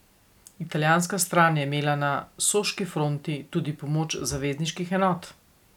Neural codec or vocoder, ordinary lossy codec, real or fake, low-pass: none; none; real; 19.8 kHz